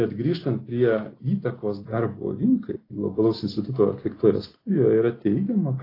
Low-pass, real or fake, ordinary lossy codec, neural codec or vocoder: 5.4 kHz; real; AAC, 24 kbps; none